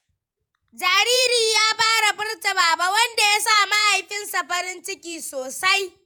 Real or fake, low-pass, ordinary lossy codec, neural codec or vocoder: fake; none; none; vocoder, 48 kHz, 128 mel bands, Vocos